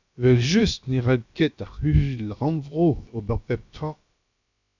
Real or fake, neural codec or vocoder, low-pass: fake; codec, 16 kHz, about 1 kbps, DyCAST, with the encoder's durations; 7.2 kHz